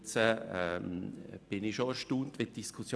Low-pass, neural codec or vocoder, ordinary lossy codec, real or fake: none; none; none; real